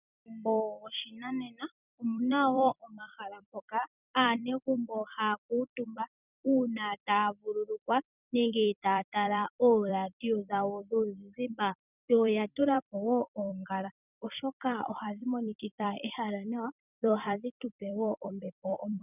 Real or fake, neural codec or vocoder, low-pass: fake; vocoder, 44.1 kHz, 128 mel bands every 256 samples, BigVGAN v2; 3.6 kHz